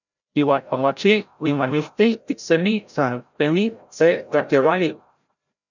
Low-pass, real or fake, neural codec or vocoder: 7.2 kHz; fake; codec, 16 kHz, 0.5 kbps, FreqCodec, larger model